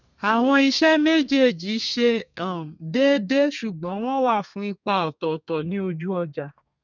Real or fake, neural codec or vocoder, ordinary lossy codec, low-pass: fake; codec, 32 kHz, 1.9 kbps, SNAC; none; 7.2 kHz